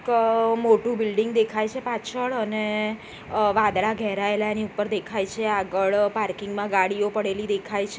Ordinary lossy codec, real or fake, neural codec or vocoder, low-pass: none; real; none; none